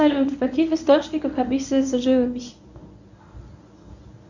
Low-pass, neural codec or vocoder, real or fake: 7.2 kHz; codec, 24 kHz, 0.9 kbps, WavTokenizer, medium speech release version 1; fake